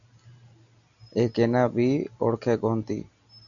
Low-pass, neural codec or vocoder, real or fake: 7.2 kHz; none; real